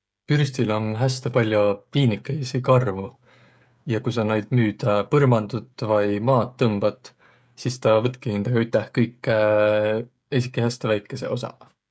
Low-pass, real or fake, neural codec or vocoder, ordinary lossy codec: none; fake; codec, 16 kHz, 16 kbps, FreqCodec, smaller model; none